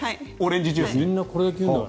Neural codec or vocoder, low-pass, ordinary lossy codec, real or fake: none; none; none; real